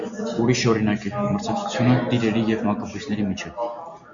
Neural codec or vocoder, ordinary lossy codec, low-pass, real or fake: none; Opus, 64 kbps; 7.2 kHz; real